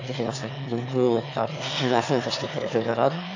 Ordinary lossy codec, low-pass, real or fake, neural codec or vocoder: AAC, 48 kbps; 7.2 kHz; fake; autoencoder, 22.05 kHz, a latent of 192 numbers a frame, VITS, trained on one speaker